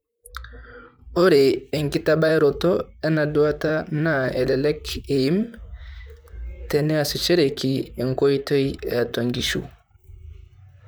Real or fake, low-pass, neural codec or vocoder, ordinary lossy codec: fake; none; vocoder, 44.1 kHz, 128 mel bands, Pupu-Vocoder; none